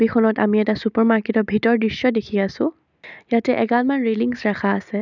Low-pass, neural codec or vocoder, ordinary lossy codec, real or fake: 7.2 kHz; none; none; real